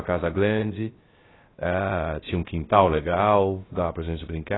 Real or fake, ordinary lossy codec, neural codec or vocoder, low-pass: fake; AAC, 16 kbps; codec, 16 kHz, 0.3 kbps, FocalCodec; 7.2 kHz